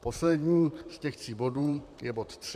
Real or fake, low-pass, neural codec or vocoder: fake; 14.4 kHz; vocoder, 44.1 kHz, 128 mel bands, Pupu-Vocoder